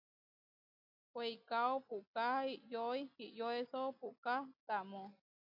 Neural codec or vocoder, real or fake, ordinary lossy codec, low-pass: none; real; AAC, 48 kbps; 5.4 kHz